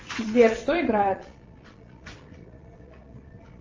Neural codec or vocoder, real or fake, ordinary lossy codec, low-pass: vocoder, 22.05 kHz, 80 mel bands, Vocos; fake; Opus, 32 kbps; 7.2 kHz